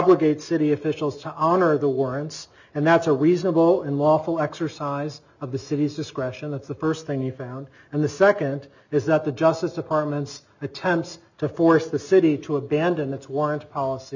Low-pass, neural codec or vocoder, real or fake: 7.2 kHz; none; real